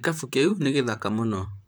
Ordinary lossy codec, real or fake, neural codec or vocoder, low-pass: none; fake; vocoder, 44.1 kHz, 128 mel bands, Pupu-Vocoder; none